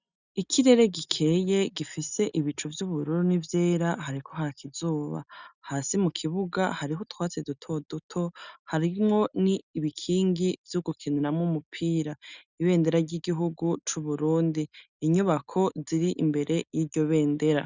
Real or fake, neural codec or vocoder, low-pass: real; none; 7.2 kHz